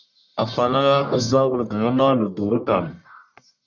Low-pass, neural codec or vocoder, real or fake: 7.2 kHz; codec, 44.1 kHz, 1.7 kbps, Pupu-Codec; fake